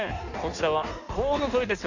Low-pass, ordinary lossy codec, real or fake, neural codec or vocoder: 7.2 kHz; none; fake; codec, 16 kHz in and 24 kHz out, 1.1 kbps, FireRedTTS-2 codec